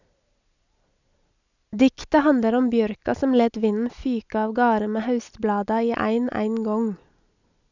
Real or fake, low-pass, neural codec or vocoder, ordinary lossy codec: real; 7.2 kHz; none; none